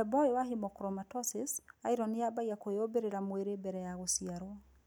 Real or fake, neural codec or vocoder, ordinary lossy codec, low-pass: real; none; none; none